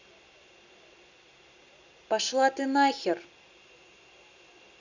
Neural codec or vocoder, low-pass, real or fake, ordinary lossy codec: none; 7.2 kHz; real; none